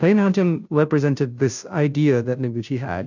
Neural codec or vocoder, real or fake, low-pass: codec, 16 kHz, 0.5 kbps, FunCodec, trained on Chinese and English, 25 frames a second; fake; 7.2 kHz